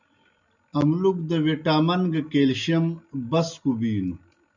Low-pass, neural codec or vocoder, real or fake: 7.2 kHz; none; real